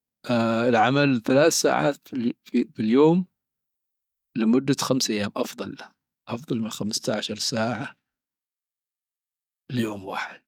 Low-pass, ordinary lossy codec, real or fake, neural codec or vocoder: 19.8 kHz; none; fake; codec, 44.1 kHz, 7.8 kbps, DAC